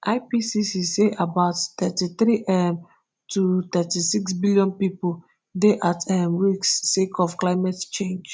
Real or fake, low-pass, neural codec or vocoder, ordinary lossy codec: real; none; none; none